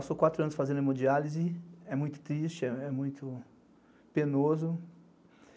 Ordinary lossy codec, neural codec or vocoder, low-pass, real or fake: none; none; none; real